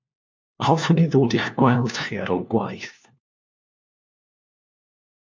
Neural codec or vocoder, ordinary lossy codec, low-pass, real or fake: codec, 16 kHz, 1 kbps, FunCodec, trained on LibriTTS, 50 frames a second; MP3, 64 kbps; 7.2 kHz; fake